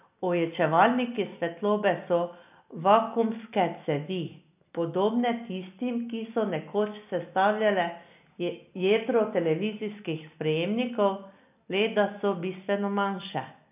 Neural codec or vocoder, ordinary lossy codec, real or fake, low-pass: none; none; real; 3.6 kHz